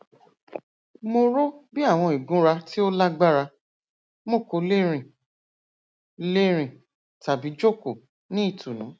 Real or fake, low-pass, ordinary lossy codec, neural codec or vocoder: real; none; none; none